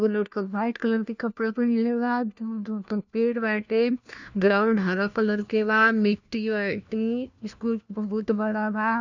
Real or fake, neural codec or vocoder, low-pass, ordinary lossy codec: fake; codec, 16 kHz, 1 kbps, FunCodec, trained on LibriTTS, 50 frames a second; 7.2 kHz; none